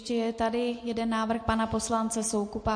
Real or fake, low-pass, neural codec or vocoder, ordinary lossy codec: real; 9.9 kHz; none; MP3, 48 kbps